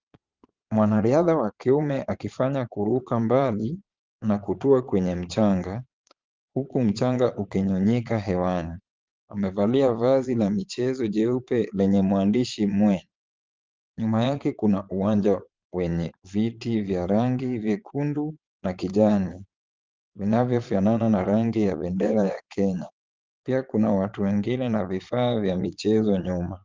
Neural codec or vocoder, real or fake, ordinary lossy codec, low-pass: vocoder, 22.05 kHz, 80 mel bands, Vocos; fake; Opus, 24 kbps; 7.2 kHz